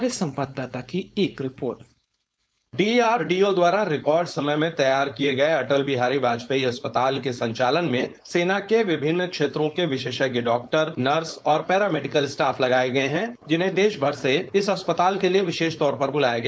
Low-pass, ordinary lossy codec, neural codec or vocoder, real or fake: none; none; codec, 16 kHz, 4.8 kbps, FACodec; fake